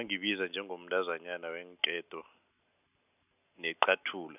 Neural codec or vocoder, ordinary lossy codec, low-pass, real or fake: none; none; 3.6 kHz; real